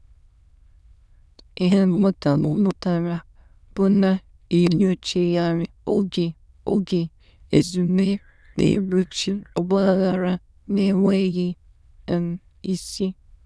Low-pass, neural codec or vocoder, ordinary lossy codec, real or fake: none; autoencoder, 22.05 kHz, a latent of 192 numbers a frame, VITS, trained on many speakers; none; fake